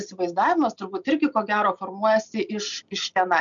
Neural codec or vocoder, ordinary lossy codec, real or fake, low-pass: none; MP3, 96 kbps; real; 7.2 kHz